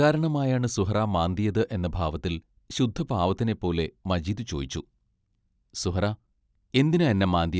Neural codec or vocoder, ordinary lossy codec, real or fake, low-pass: none; none; real; none